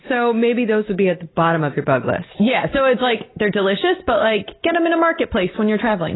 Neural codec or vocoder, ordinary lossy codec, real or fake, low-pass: none; AAC, 16 kbps; real; 7.2 kHz